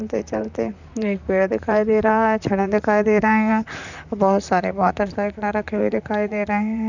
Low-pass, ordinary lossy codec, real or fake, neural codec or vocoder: 7.2 kHz; none; fake; vocoder, 44.1 kHz, 128 mel bands, Pupu-Vocoder